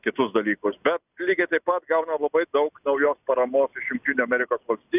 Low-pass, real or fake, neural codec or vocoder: 3.6 kHz; real; none